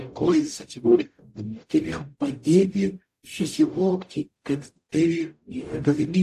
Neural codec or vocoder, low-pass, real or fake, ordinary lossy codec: codec, 44.1 kHz, 0.9 kbps, DAC; 14.4 kHz; fake; AAC, 64 kbps